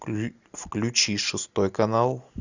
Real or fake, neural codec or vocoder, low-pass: real; none; 7.2 kHz